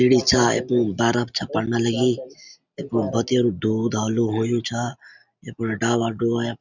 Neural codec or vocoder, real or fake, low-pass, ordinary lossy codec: none; real; 7.2 kHz; none